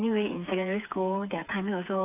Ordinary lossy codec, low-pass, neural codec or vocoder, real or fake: AAC, 32 kbps; 3.6 kHz; codec, 16 kHz, 4 kbps, FreqCodec, smaller model; fake